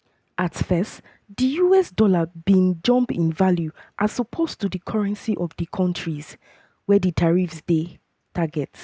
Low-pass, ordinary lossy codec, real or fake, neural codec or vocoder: none; none; real; none